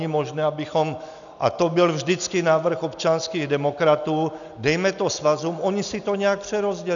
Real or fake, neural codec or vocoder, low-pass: real; none; 7.2 kHz